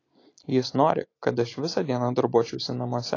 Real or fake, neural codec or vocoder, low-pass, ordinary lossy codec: real; none; 7.2 kHz; AAC, 32 kbps